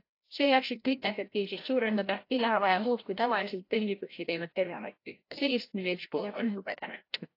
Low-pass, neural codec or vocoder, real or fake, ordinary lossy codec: 5.4 kHz; codec, 16 kHz, 0.5 kbps, FreqCodec, larger model; fake; AAC, 32 kbps